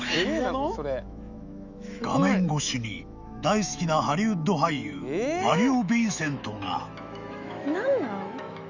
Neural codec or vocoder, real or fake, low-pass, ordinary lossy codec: autoencoder, 48 kHz, 128 numbers a frame, DAC-VAE, trained on Japanese speech; fake; 7.2 kHz; none